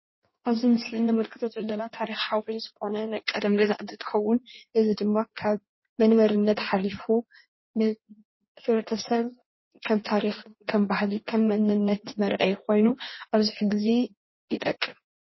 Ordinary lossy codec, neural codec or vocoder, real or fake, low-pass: MP3, 24 kbps; codec, 16 kHz in and 24 kHz out, 1.1 kbps, FireRedTTS-2 codec; fake; 7.2 kHz